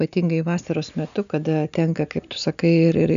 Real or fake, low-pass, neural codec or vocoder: real; 7.2 kHz; none